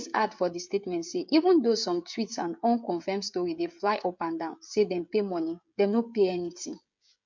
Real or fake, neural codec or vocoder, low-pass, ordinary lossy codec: fake; codec, 16 kHz, 16 kbps, FreqCodec, smaller model; 7.2 kHz; MP3, 48 kbps